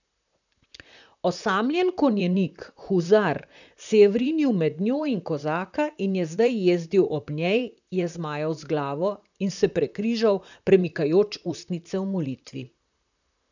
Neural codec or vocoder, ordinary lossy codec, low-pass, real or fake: vocoder, 44.1 kHz, 128 mel bands, Pupu-Vocoder; none; 7.2 kHz; fake